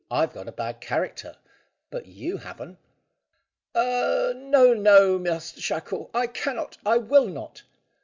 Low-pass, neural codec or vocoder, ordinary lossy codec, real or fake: 7.2 kHz; none; MP3, 64 kbps; real